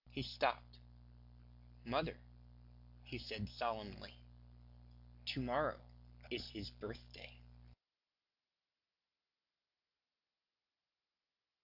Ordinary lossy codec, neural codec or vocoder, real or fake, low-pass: AAC, 48 kbps; none; real; 5.4 kHz